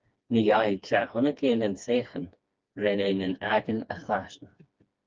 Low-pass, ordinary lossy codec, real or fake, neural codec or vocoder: 7.2 kHz; Opus, 24 kbps; fake; codec, 16 kHz, 2 kbps, FreqCodec, smaller model